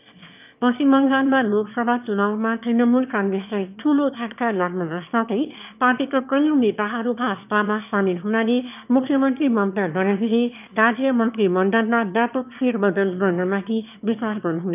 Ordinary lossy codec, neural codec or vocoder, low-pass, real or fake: none; autoencoder, 22.05 kHz, a latent of 192 numbers a frame, VITS, trained on one speaker; 3.6 kHz; fake